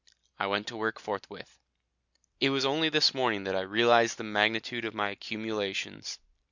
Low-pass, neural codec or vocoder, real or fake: 7.2 kHz; none; real